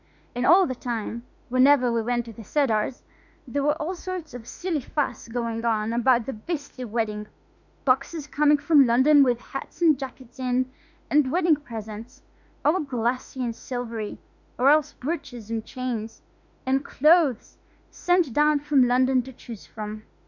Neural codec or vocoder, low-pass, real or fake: autoencoder, 48 kHz, 32 numbers a frame, DAC-VAE, trained on Japanese speech; 7.2 kHz; fake